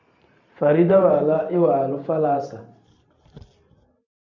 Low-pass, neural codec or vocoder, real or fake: 7.2 kHz; vocoder, 44.1 kHz, 128 mel bands every 512 samples, BigVGAN v2; fake